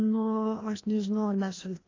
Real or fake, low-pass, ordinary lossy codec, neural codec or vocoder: fake; 7.2 kHz; AAC, 32 kbps; codec, 16 kHz, 1 kbps, FreqCodec, larger model